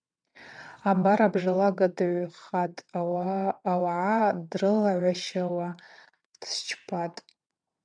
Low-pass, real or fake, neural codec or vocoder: 9.9 kHz; fake; vocoder, 22.05 kHz, 80 mel bands, WaveNeXt